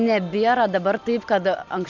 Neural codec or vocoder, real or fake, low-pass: none; real; 7.2 kHz